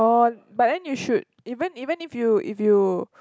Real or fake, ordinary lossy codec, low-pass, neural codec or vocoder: real; none; none; none